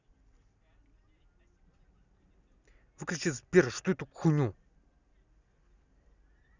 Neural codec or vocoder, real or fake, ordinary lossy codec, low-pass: none; real; none; 7.2 kHz